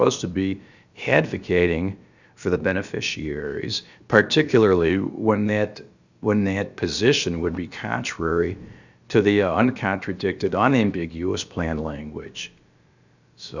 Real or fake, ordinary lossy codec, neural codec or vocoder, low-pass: fake; Opus, 64 kbps; codec, 16 kHz, about 1 kbps, DyCAST, with the encoder's durations; 7.2 kHz